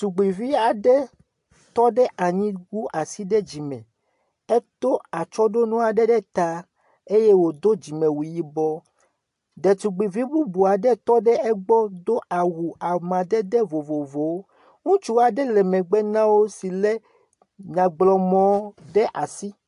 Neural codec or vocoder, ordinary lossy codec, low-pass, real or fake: none; AAC, 64 kbps; 10.8 kHz; real